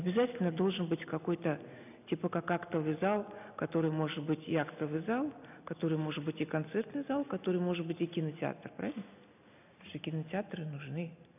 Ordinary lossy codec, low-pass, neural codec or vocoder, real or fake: none; 3.6 kHz; none; real